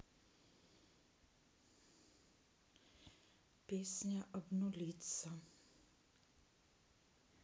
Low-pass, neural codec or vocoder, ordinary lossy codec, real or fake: none; none; none; real